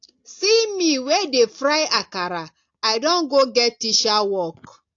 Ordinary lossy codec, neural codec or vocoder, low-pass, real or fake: AAC, 48 kbps; none; 7.2 kHz; real